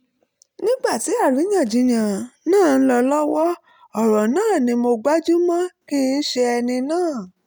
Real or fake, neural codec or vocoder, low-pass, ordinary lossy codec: real; none; none; none